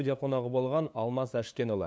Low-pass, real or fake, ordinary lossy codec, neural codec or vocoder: none; fake; none; codec, 16 kHz, 2 kbps, FunCodec, trained on LibriTTS, 25 frames a second